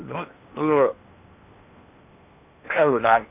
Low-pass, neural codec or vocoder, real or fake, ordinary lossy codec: 3.6 kHz; codec, 16 kHz in and 24 kHz out, 0.8 kbps, FocalCodec, streaming, 65536 codes; fake; none